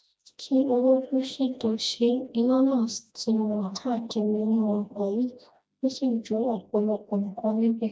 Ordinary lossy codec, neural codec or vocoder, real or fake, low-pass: none; codec, 16 kHz, 1 kbps, FreqCodec, smaller model; fake; none